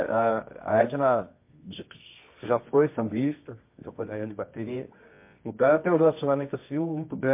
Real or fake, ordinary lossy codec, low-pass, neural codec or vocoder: fake; MP3, 24 kbps; 3.6 kHz; codec, 24 kHz, 0.9 kbps, WavTokenizer, medium music audio release